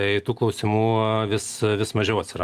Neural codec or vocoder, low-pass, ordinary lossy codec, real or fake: none; 14.4 kHz; Opus, 32 kbps; real